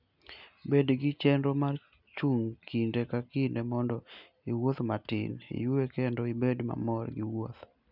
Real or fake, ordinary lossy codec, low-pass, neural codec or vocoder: real; none; 5.4 kHz; none